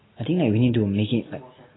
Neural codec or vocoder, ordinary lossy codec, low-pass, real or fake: none; AAC, 16 kbps; 7.2 kHz; real